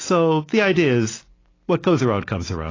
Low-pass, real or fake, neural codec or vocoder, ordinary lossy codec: 7.2 kHz; real; none; AAC, 32 kbps